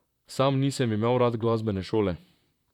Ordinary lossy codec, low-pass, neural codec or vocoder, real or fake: none; 19.8 kHz; vocoder, 44.1 kHz, 128 mel bands, Pupu-Vocoder; fake